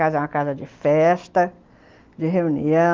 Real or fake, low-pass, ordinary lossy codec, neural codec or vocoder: real; 7.2 kHz; Opus, 32 kbps; none